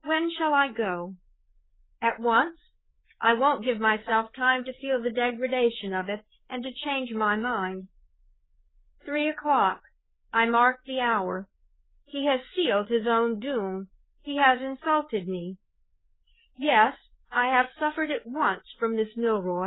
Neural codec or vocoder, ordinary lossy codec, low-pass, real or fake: codec, 16 kHz, 4 kbps, FreqCodec, larger model; AAC, 16 kbps; 7.2 kHz; fake